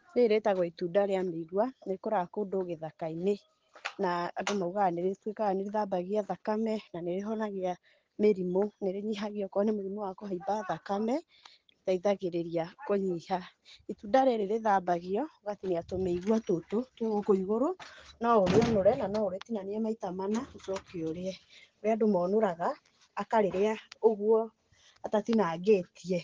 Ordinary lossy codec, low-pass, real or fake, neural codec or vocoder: Opus, 16 kbps; 7.2 kHz; real; none